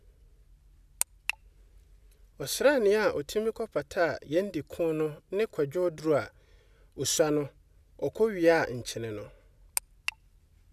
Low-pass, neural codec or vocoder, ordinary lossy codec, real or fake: 14.4 kHz; none; none; real